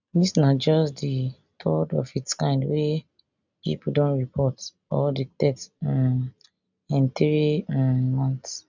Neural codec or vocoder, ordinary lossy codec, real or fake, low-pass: none; none; real; 7.2 kHz